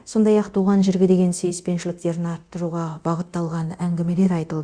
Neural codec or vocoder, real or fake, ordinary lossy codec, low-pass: codec, 24 kHz, 0.9 kbps, DualCodec; fake; Opus, 64 kbps; 9.9 kHz